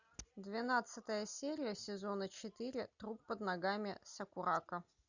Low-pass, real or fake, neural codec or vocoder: 7.2 kHz; real; none